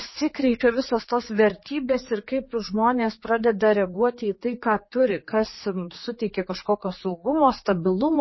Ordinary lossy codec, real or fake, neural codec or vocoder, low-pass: MP3, 24 kbps; fake; codec, 16 kHz, 4 kbps, X-Codec, HuBERT features, trained on general audio; 7.2 kHz